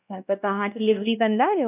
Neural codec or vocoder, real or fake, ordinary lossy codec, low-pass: codec, 16 kHz, 1 kbps, X-Codec, WavLM features, trained on Multilingual LibriSpeech; fake; none; 3.6 kHz